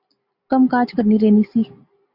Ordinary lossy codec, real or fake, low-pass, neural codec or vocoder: Opus, 64 kbps; real; 5.4 kHz; none